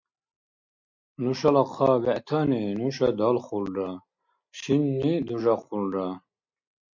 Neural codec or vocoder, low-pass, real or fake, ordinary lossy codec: none; 7.2 kHz; real; MP3, 48 kbps